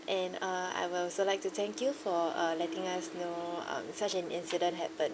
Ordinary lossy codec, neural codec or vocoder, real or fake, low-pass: none; none; real; none